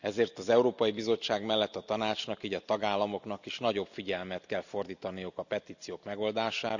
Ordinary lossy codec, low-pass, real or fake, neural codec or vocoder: none; 7.2 kHz; real; none